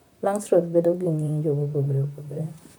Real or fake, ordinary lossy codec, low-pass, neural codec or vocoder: fake; none; none; vocoder, 44.1 kHz, 128 mel bands, Pupu-Vocoder